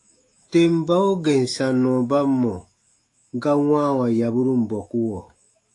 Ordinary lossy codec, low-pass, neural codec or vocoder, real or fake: AAC, 48 kbps; 10.8 kHz; autoencoder, 48 kHz, 128 numbers a frame, DAC-VAE, trained on Japanese speech; fake